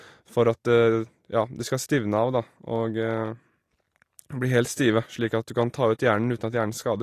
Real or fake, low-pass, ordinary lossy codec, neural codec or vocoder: real; 14.4 kHz; AAC, 48 kbps; none